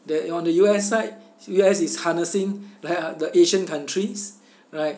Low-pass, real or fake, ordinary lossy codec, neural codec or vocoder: none; real; none; none